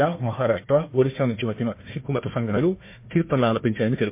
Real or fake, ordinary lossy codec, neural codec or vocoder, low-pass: fake; MP3, 24 kbps; codec, 16 kHz, 1 kbps, FunCodec, trained on Chinese and English, 50 frames a second; 3.6 kHz